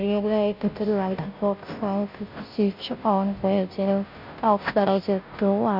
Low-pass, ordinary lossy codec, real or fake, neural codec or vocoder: 5.4 kHz; none; fake; codec, 16 kHz, 0.5 kbps, FunCodec, trained on Chinese and English, 25 frames a second